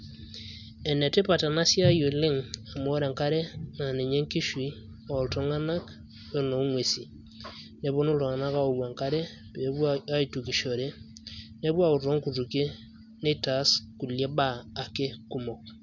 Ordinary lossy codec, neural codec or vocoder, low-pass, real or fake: none; none; 7.2 kHz; real